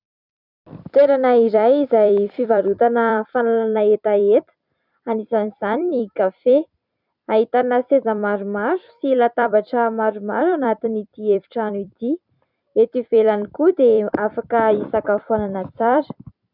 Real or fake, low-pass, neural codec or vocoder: fake; 5.4 kHz; vocoder, 44.1 kHz, 128 mel bands every 256 samples, BigVGAN v2